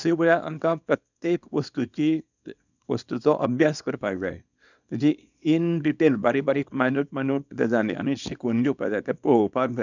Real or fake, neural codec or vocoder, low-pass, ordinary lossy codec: fake; codec, 24 kHz, 0.9 kbps, WavTokenizer, small release; 7.2 kHz; none